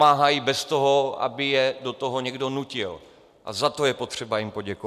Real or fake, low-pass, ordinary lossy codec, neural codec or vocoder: real; 14.4 kHz; MP3, 96 kbps; none